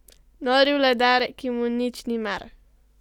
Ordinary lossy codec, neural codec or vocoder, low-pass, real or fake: none; none; 19.8 kHz; real